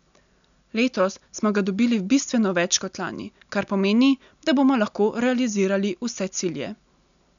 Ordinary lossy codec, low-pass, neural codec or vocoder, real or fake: none; 7.2 kHz; none; real